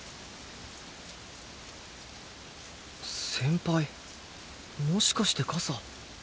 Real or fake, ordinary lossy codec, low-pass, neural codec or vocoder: real; none; none; none